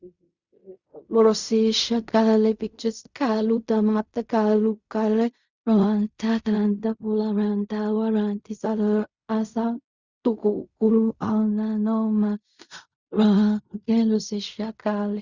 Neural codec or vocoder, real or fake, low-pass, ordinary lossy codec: codec, 16 kHz in and 24 kHz out, 0.4 kbps, LongCat-Audio-Codec, fine tuned four codebook decoder; fake; 7.2 kHz; Opus, 64 kbps